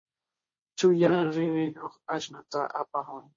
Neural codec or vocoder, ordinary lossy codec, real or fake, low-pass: codec, 16 kHz, 1.1 kbps, Voila-Tokenizer; MP3, 32 kbps; fake; 7.2 kHz